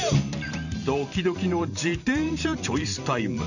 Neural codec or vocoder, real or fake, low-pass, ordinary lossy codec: vocoder, 44.1 kHz, 128 mel bands every 512 samples, BigVGAN v2; fake; 7.2 kHz; none